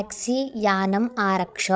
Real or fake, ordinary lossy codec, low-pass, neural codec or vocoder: fake; none; none; codec, 16 kHz, 16 kbps, FreqCodec, larger model